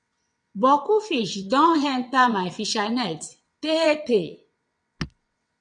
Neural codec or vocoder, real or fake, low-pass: vocoder, 22.05 kHz, 80 mel bands, WaveNeXt; fake; 9.9 kHz